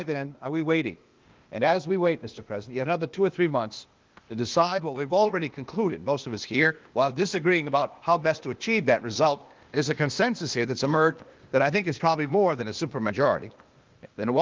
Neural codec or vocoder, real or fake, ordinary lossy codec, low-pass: codec, 16 kHz, 0.8 kbps, ZipCodec; fake; Opus, 32 kbps; 7.2 kHz